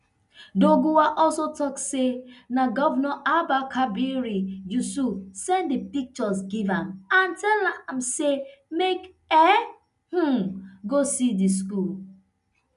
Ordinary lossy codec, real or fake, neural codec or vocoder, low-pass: none; real; none; 10.8 kHz